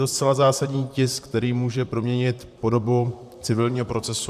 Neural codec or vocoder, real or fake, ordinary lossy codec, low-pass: vocoder, 44.1 kHz, 128 mel bands, Pupu-Vocoder; fake; AAC, 96 kbps; 14.4 kHz